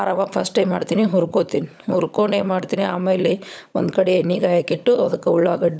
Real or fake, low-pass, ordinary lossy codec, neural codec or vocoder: fake; none; none; codec, 16 kHz, 16 kbps, FunCodec, trained on LibriTTS, 50 frames a second